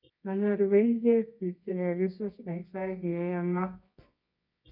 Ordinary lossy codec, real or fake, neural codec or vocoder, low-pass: none; fake; codec, 24 kHz, 0.9 kbps, WavTokenizer, medium music audio release; 5.4 kHz